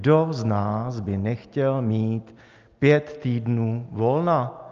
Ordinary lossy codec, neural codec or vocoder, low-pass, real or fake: Opus, 24 kbps; none; 7.2 kHz; real